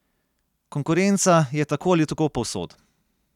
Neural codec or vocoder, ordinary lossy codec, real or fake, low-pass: none; none; real; 19.8 kHz